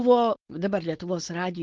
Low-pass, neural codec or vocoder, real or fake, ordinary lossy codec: 7.2 kHz; codec, 16 kHz, 4.8 kbps, FACodec; fake; Opus, 16 kbps